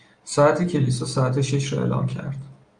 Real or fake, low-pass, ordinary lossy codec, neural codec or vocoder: real; 9.9 kHz; Opus, 32 kbps; none